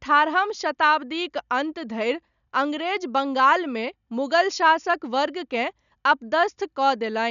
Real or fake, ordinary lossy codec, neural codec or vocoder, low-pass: real; none; none; 7.2 kHz